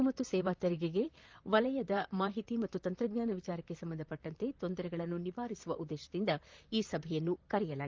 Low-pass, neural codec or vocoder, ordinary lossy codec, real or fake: 7.2 kHz; vocoder, 44.1 kHz, 128 mel bands, Pupu-Vocoder; Opus, 32 kbps; fake